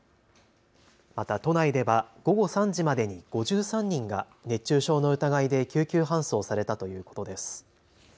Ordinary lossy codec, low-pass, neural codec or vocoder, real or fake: none; none; none; real